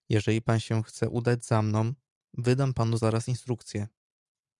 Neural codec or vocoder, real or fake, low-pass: vocoder, 44.1 kHz, 128 mel bands every 512 samples, BigVGAN v2; fake; 10.8 kHz